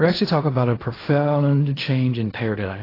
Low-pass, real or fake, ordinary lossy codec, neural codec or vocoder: 5.4 kHz; fake; AAC, 24 kbps; codec, 16 kHz in and 24 kHz out, 0.4 kbps, LongCat-Audio-Codec, fine tuned four codebook decoder